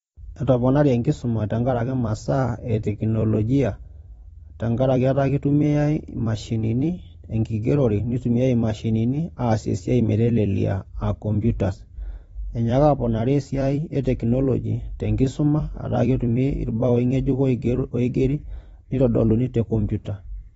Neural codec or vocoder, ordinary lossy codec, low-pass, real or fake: vocoder, 44.1 kHz, 128 mel bands every 256 samples, BigVGAN v2; AAC, 24 kbps; 19.8 kHz; fake